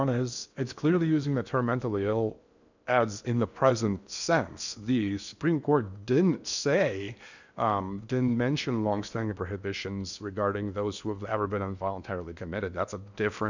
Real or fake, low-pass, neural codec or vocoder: fake; 7.2 kHz; codec, 16 kHz in and 24 kHz out, 0.8 kbps, FocalCodec, streaming, 65536 codes